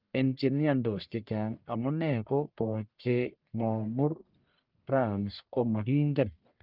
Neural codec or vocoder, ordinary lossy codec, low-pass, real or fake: codec, 44.1 kHz, 1.7 kbps, Pupu-Codec; Opus, 24 kbps; 5.4 kHz; fake